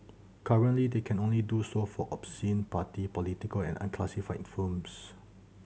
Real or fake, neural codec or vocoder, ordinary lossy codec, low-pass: real; none; none; none